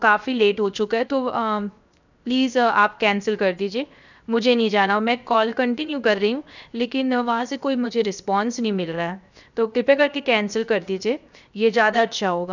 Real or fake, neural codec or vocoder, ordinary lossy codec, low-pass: fake; codec, 16 kHz, 0.7 kbps, FocalCodec; none; 7.2 kHz